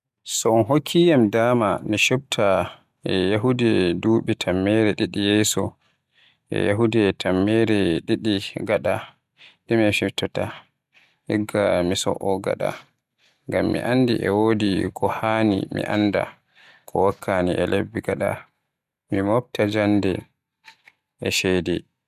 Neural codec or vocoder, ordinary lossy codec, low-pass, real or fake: none; none; 14.4 kHz; real